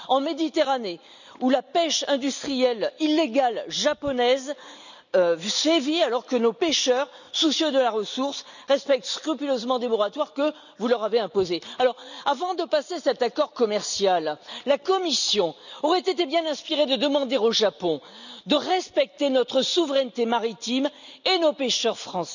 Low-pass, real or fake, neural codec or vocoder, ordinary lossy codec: 7.2 kHz; real; none; none